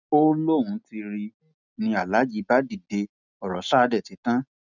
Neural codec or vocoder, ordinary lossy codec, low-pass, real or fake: none; none; 7.2 kHz; real